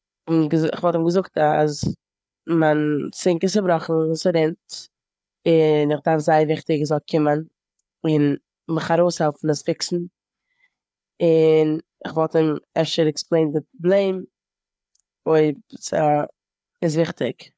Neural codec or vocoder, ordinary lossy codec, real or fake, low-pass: codec, 16 kHz, 4 kbps, FreqCodec, larger model; none; fake; none